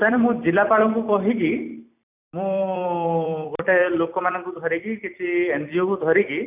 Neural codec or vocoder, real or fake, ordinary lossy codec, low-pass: vocoder, 44.1 kHz, 128 mel bands every 256 samples, BigVGAN v2; fake; none; 3.6 kHz